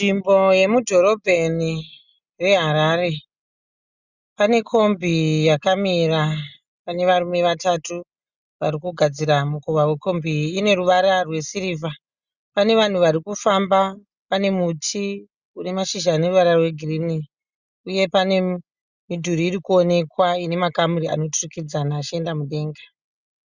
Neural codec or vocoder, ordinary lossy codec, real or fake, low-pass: none; Opus, 64 kbps; real; 7.2 kHz